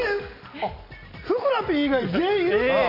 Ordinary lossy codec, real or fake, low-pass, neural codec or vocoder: none; real; 5.4 kHz; none